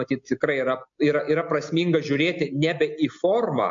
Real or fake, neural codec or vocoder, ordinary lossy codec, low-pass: real; none; MP3, 48 kbps; 7.2 kHz